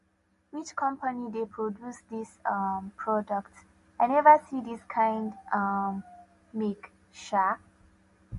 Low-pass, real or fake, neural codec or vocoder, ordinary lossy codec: 14.4 kHz; real; none; MP3, 48 kbps